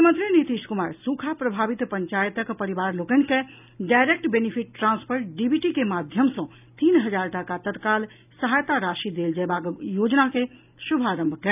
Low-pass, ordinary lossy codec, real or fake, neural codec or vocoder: 3.6 kHz; none; real; none